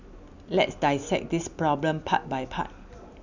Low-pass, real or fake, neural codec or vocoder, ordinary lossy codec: 7.2 kHz; real; none; none